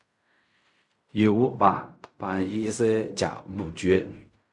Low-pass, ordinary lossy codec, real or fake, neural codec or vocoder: 10.8 kHz; MP3, 96 kbps; fake; codec, 16 kHz in and 24 kHz out, 0.4 kbps, LongCat-Audio-Codec, fine tuned four codebook decoder